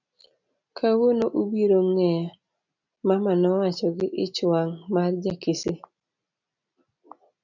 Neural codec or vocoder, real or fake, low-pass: none; real; 7.2 kHz